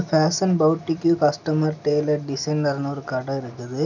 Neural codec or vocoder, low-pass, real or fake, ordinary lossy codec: none; 7.2 kHz; real; none